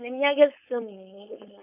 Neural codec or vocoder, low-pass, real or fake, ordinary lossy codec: codec, 16 kHz, 4.8 kbps, FACodec; 3.6 kHz; fake; none